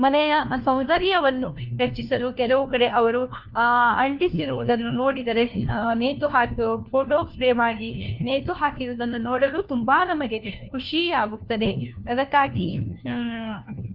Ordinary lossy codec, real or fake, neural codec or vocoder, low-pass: Opus, 24 kbps; fake; codec, 16 kHz, 1 kbps, FunCodec, trained on LibriTTS, 50 frames a second; 5.4 kHz